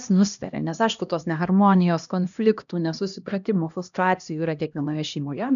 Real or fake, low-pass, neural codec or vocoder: fake; 7.2 kHz; codec, 16 kHz, 1 kbps, X-Codec, HuBERT features, trained on LibriSpeech